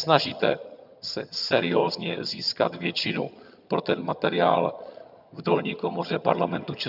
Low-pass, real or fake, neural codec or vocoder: 5.4 kHz; fake; vocoder, 22.05 kHz, 80 mel bands, HiFi-GAN